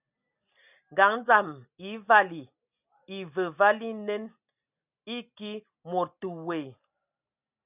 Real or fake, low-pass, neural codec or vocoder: real; 3.6 kHz; none